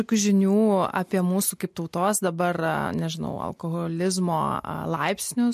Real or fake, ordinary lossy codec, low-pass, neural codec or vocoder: real; MP3, 64 kbps; 14.4 kHz; none